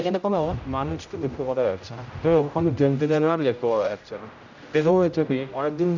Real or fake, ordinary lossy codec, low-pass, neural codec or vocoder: fake; none; 7.2 kHz; codec, 16 kHz, 0.5 kbps, X-Codec, HuBERT features, trained on general audio